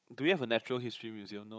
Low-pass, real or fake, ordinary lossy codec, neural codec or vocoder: none; fake; none; codec, 16 kHz, 16 kbps, FunCodec, trained on Chinese and English, 50 frames a second